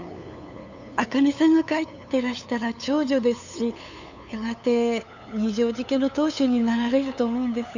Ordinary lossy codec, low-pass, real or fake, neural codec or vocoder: none; 7.2 kHz; fake; codec, 16 kHz, 8 kbps, FunCodec, trained on LibriTTS, 25 frames a second